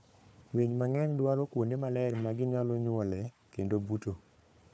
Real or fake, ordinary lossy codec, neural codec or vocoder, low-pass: fake; none; codec, 16 kHz, 4 kbps, FunCodec, trained on Chinese and English, 50 frames a second; none